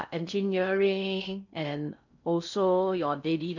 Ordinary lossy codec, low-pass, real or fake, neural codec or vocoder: none; 7.2 kHz; fake; codec, 16 kHz in and 24 kHz out, 0.6 kbps, FocalCodec, streaming, 2048 codes